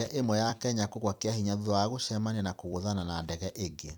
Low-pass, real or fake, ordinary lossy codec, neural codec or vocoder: none; fake; none; vocoder, 44.1 kHz, 128 mel bands every 256 samples, BigVGAN v2